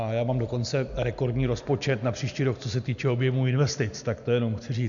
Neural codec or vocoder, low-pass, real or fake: none; 7.2 kHz; real